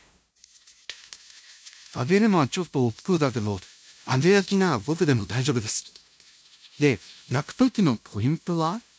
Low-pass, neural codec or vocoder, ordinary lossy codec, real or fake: none; codec, 16 kHz, 0.5 kbps, FunCodec, trained on LibriTTS, 25 frames a second; none; fake